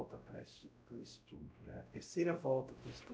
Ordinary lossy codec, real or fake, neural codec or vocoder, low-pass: none; fake; codec, 16 kHz, 0.5 kbps, X-Codec, WavLM features, trained on Multilingual LibriSpeech; none